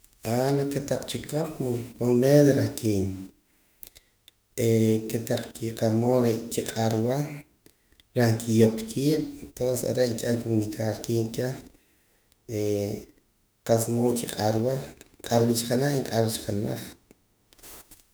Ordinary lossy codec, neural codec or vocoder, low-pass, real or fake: none; autoencoder, 48 kHz, 32 numbers a frame, DAC-VAE, trained on Japanese speech; none; fake